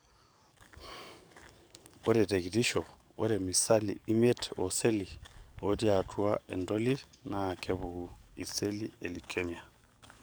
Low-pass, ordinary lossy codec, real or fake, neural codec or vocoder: none; none; fake; codec, 44.1 kHz, 7.8 kbps, DAC